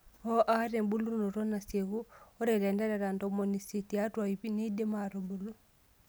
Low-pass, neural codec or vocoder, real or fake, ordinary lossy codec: none; none; real; none